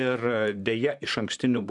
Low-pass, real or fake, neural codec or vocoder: 10.8 kHz; fake; vocoder, 44.1 kHz, 128 mel bands, Pupu-Vocoder